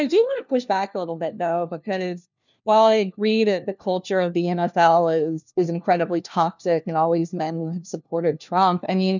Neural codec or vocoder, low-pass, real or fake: codec, 16 kHz, 1 kbps, FunCodec, trained on LibriTTS, 50 frames a second; 7.2 kHz; fake